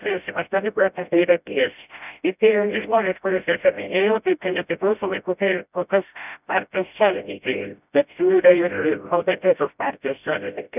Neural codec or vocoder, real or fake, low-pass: codec, 16 kHz, 0.5 kbps, FreqCodec, smaller model; fake; 3.6 kHz